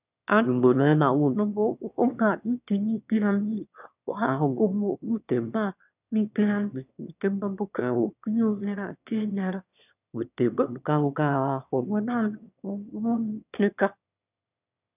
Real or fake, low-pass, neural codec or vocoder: fake; 3.6 kHz; autoencoder, 22.05 kHz, a latent of 192 numbers a frame, VITS, trained on one speaker